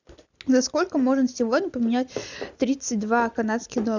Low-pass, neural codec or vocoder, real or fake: 7.2 kHz; none; real